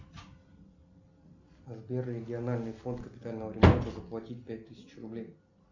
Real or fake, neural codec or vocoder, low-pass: real; none; 7.2 kHz